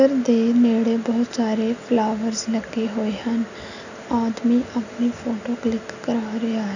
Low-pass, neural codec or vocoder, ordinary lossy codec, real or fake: 7.2 kHz; none; none; real